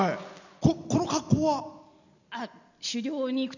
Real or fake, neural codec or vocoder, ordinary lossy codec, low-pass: real; none; none; 7.2 kHz